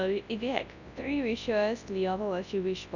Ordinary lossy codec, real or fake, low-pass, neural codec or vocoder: none; fake; 7.2 kHz; codec, 24 kHz, 0.9 kbps, WavTokenizer, large speech release